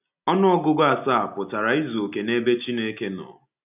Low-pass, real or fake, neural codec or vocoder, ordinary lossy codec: 3.6 kHz; real; none; none